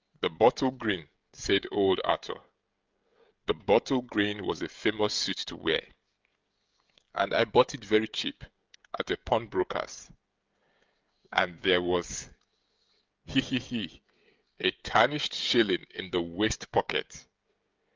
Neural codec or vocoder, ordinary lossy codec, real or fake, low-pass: codec, 16 kHz, 16 kbps, FreqCodec, smaller model; Opus, 24 kbps; fake; 7.2 kHz